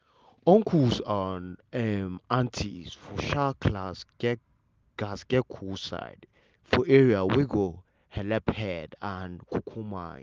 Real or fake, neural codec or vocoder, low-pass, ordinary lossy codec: real; none; 7.2 kHz; Opus, 24 kbps